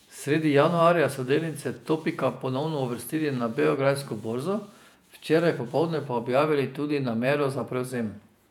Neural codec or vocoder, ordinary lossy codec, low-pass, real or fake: vocoder, 48 kHz, 128 mel bands, Vocos; none; 19.8 kHz; fake